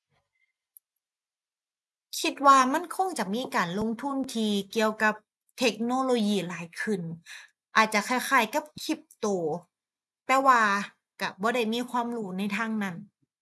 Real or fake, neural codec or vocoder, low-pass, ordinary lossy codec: real; none; none; none